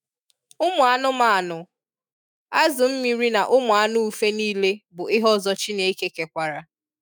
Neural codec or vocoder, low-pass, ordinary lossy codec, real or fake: autoencoder, 48 kHz, 128 numbers a frame, DAC-VAE, trained on Japanese speech; none; none; fake